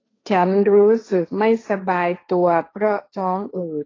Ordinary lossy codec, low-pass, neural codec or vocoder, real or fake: AAC, 32 kbps; 7.2 kHz; codec, 16 kHz, 1.1 kbps, Voila-Tokenizer; fake